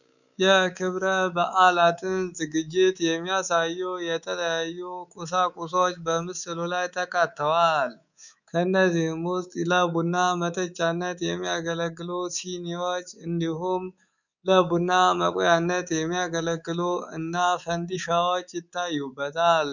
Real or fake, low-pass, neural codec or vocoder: fake; 7.2 kHz; codec, 24 kHz, 3.1 kbps, DualCodec